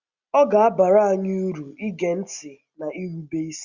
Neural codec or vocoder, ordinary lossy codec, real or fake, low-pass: none; Opus, 64 kbps; real; 7.2 kHz